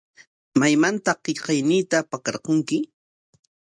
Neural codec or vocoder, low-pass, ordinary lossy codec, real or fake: none; 9.9 kHz; MP3, 96 kbps; real